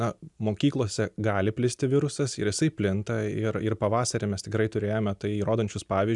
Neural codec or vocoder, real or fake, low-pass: none; real; 10.8 kHz